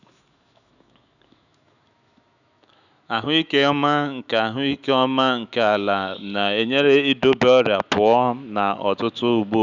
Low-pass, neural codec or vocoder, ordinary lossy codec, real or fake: 7.2 kHz; autoencoder, 48 kHz, 128 numbers a frame, DAC-VAE, trained on Japanese speech; none; fake